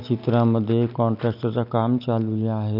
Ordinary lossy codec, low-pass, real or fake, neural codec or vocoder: none; 5.4 kHz; real; none